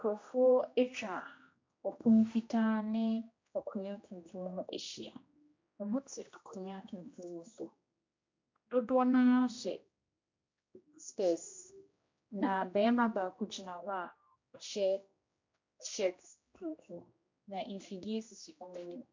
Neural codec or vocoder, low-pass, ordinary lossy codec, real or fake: codec, 16 kHz, 1 kbps, X-Codec, HuBERT features, trained on general audio; 7.2 kHz; MP3, 48 kbps; fake